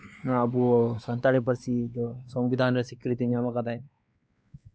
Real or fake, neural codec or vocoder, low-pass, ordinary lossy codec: fake; codec, 16 kHz, 2 kbps, X-Codec, WavLM features, trained on Multilingual LibriSpeech; none; none